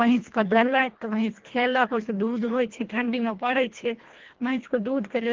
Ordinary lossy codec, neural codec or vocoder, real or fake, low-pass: Opus, 16 kbps; codec, 24 kHz, 1.5 kbps, HILCodec; fake; 7.2 kHz